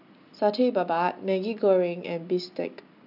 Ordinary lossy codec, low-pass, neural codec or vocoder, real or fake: none; 5.4 kHz; none; real